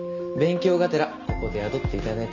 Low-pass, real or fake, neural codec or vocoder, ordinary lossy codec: 7.2 kHz; real; none; none